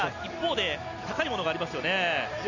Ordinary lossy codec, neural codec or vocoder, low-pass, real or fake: Opus, 64 kbps; none; 7.2 kHz; real